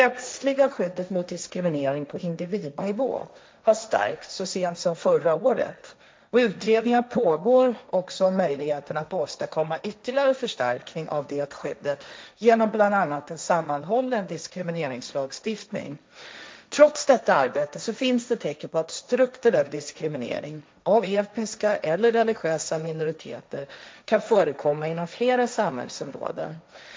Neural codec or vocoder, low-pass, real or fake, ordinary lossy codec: codec, 16 kHz, 1.1 kbps, Voila-Tokenizer; none; fake; none